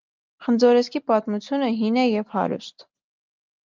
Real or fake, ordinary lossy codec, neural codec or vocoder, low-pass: real; Opus, 24 kbps; none; 7.2 kHz